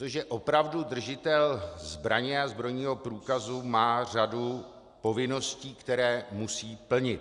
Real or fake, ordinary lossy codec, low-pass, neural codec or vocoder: real; Opus, 64 kbps; 10.8 kHz; none